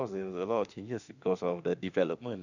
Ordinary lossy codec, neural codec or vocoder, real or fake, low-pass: none; autoencoder, 48 kHz, 32 numbers a frame, DAC-VAE, trained on Japanese speech; fake; 7.2 kHz